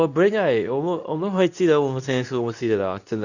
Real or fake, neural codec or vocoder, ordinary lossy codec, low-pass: fake; codec, 24 kHz, 0.9 kbps, WavTokenizer, medium speech release version 2; none; 7.2 kHz